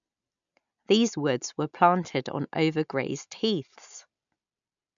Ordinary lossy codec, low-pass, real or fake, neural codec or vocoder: none; 7.2 kHz; real; none